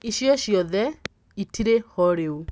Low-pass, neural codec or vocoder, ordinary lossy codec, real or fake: none; none; none; real